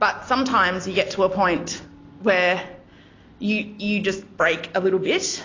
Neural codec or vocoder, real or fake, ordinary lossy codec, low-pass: none; real; AAC, 32 kbps; 7.2 kHz